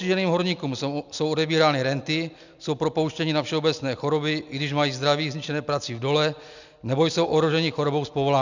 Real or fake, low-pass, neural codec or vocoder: real; 7.2 kHz; none